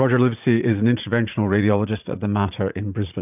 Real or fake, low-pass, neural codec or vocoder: fake; 3.6 kHz; vocoder, 44.1 kHz, 80 mel bands, Vocos